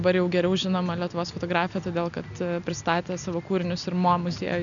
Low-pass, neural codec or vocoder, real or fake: 7.2 kHz; none; real